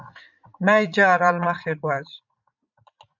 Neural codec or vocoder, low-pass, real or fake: vocoder, 44.1 kHz, 80 mel bands, Vocos; 7.2 kHz; fake